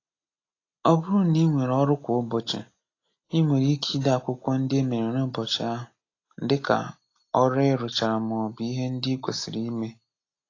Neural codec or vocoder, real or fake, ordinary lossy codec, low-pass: none; real; AAC, 32 kbps; 7.2 kHz